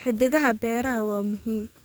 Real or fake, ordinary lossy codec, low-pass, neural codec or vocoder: fake; none; none; codec, 44.1 kHz, 2.6 kbps, SNAC